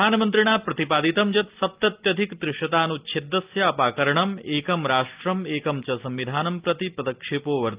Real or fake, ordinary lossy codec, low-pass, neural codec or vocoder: real; Opus, 32 kbps; 3.6 kHz; none